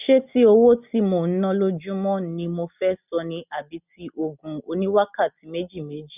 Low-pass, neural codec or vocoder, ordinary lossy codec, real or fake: 3.6 kHz; none; none; real